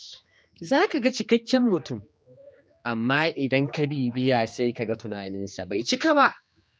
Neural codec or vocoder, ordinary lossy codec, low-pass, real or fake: codec, 16 kHz, 2 kbps, X-Codec, HuBERT features, trained on general audio; none; none; fake